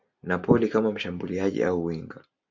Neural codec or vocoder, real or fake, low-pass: none; real; 7.2 kHz